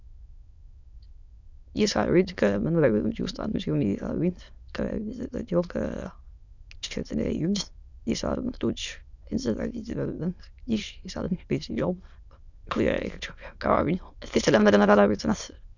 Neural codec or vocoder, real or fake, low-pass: autoencoder, 22.05 kHz, a latent of 192 numbers a frame, VITS, trained on many speakers; fake; 7.2 kHz